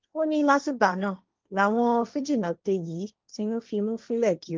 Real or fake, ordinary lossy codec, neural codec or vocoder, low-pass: fake; Opus, 24 kbps; codec, 16 kHz, 1.1 kbps, Voila-Tokenizer; 7.2 kHz